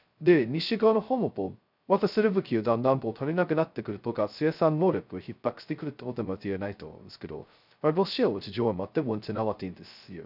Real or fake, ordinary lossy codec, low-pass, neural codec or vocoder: fake; none; 5.4 kHz; codec, 16 kHz, 0.2 kbps, FocalCodec